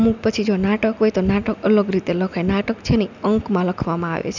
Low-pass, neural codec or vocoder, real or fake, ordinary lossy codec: 7.2 kHz; none; real; none